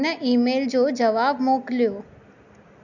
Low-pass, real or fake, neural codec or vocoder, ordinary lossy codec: 7.2 kHz; real; none; none